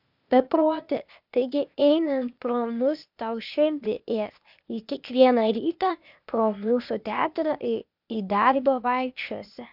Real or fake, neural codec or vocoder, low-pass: fake; codec, 16 kHz, 0.8 kbps, ZipCodec; 5.4 kHz